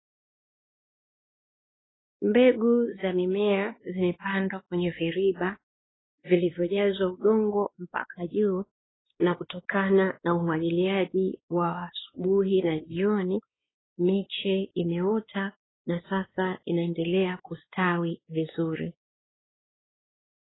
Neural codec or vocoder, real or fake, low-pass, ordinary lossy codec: codec, 16 kHz, 2 kbps, X-Codec, WavLM features, trained on Multilingual LibriSpeech; fake; 7.2 kHz; AAC, 16 kbps